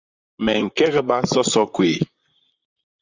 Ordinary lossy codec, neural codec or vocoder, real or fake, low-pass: Opus, 64 kbps; vocoder, 44.1 kHz, 128 mel bands, Pupu-Vocoder; fake; 7.2 kHz